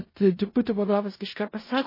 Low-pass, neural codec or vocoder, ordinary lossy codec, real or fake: 5.4 kHz; codec, 16 kHz in and 24 kHz out, 0.4 kbps, LongCat-Audio-Codec, four codebook decoder; MP3, 24 kbps; fake